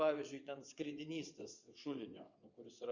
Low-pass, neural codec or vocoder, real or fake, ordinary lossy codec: 7.2 kHz; vocoder, 22.05 kHz, 80 mel bands, WaveNeXt; fake; Opus, 64 kbps